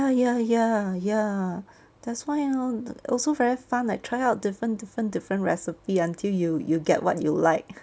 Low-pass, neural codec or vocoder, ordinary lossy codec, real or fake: none; none; none; real